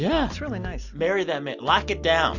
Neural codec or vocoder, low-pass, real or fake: none; 7.2 kHz; real